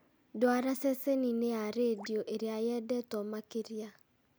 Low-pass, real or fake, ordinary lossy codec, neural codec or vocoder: none; real; none; none